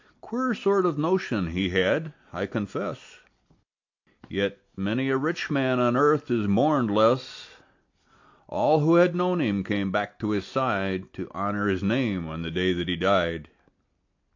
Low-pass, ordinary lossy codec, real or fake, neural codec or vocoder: 7.2 kHz; AAC, 48 kbps; real; none